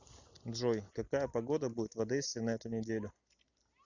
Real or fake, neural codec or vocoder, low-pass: real; none; 7.2 kHz